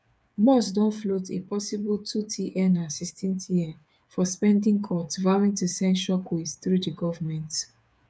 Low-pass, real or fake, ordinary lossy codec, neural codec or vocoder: none; fake; none; codec, 16 kHz, 8 kbps, FreqCodec, smaller model